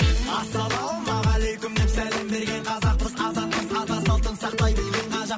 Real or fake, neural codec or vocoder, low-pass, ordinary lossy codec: real; none; none; none